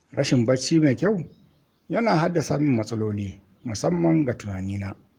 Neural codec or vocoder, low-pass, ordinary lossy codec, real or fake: codec, 44.1 kHz, 7.8 kbps, Pupu-Codec; 19.8 kHz; Opus, 32 kbps; fake